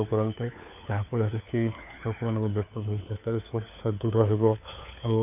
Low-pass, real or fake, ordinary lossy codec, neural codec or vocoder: 3.6 kHz; fake; none; codec, 16 kHz, 4 kbps, FunCodec, trained on LibriTTS, 50 frames a second